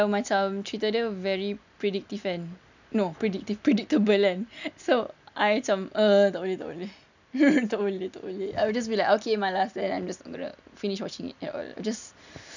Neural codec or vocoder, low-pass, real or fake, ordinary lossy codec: none; 7.2 kHz; real; none